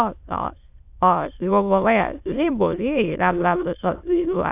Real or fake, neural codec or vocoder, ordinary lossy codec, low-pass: fake; autoencoder, 22.05 kHz, a latent of 192 numbers a frame, VITS, trained on many speakers; AAC, 32 kbps; 3.6 kHz